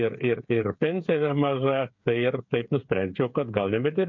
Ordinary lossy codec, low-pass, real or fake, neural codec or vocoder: MP3, 48 kbps; 7.2 kHz; fake; codec, 16 kHz, 8 kbps, FreqCodec, smaller model